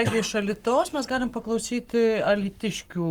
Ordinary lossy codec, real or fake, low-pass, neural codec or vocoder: Opus, 32 kbps; fake; 19.8 kHz; codec, 44.1 kHz, 7.8 kbps, Pupu-Codec